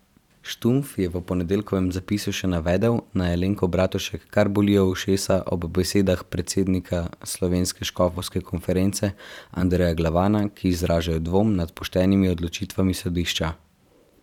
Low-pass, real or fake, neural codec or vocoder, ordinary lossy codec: 19.8 kHz; fake; vocoder, 44.1 kHz, 128 mel bands every 512 samples, BigVGAN v2; none